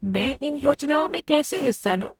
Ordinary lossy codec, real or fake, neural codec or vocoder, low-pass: none; fake; codec, 44.1 kHz, 0.9 kbps, DAC; 19.8 kHz